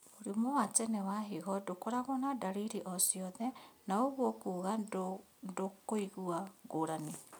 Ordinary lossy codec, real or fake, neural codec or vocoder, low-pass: none; real; none; none